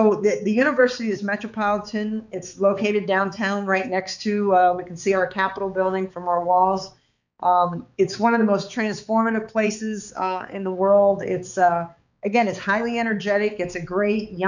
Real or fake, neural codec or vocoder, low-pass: fake; codec, 16 kHz, 4 kbps, X-Codec, HuBERT features, trained on balanced general audio; 7.2 kHz